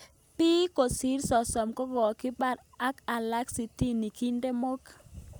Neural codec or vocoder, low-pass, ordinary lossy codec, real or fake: none; none; none; real